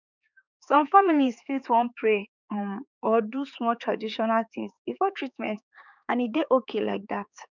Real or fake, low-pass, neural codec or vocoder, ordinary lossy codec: fake; 7.2 kHz; codec, 16 kHz, 4 kbps, X-Codec, HuBERT features, trained on balanced general audio; none